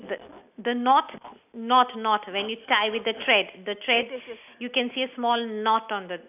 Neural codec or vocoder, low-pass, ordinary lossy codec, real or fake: none; 3.6 kHz; none; real